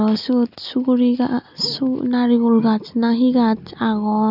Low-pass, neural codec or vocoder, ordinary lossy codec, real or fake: 5.4 kHz; none; none; real